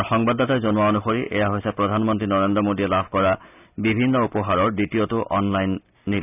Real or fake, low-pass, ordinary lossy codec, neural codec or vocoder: real; 3.6 kHz; none; none